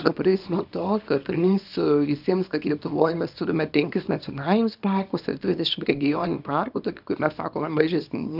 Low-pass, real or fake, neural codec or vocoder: 5.4 kHz; fake; codec, 24 kHz, 0.9 kbps, WavTokenizer, small release